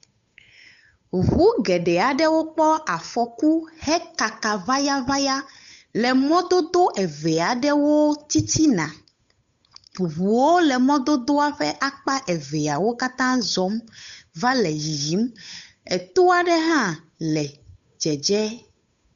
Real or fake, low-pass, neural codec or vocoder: fake; 7.2 kHz; codec, 16 kHz, 8 kbps, FunCodec, trained on Chinese and English, 25 frames a second